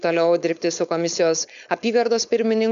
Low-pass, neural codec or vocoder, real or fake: 7.2 kHz; codec, 16 kHz, 4.8 kbps, FACodec; fake